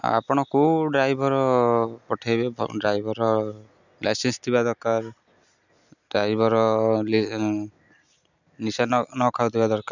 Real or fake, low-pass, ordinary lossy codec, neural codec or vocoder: real; 7.2 kHz; none; none